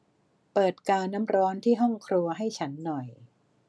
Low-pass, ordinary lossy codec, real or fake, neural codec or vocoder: none; none; real; none